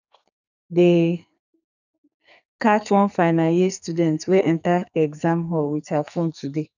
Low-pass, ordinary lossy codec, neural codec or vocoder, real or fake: 7.2 kHz; none; codec, 44.1 kHz, 2.6 kbps, SNAC; fake